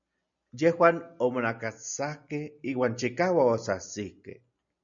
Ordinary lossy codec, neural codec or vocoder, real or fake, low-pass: MP3, 96 kbps; none; real; 7.2 kHz